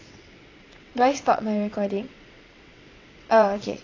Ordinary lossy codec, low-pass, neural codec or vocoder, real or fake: AAC, 32 kbps; 7.2 kHz; vocoder, 22.05 kHz, 80 mel bands, WaveNeXt; fake